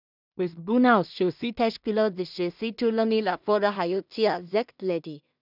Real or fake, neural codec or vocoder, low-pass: fake; codec, 16 kHz in and 24 kHz out, 0.4 kbps, LongCat-Audio-Codec, two codebook decoder; 5.4 kHz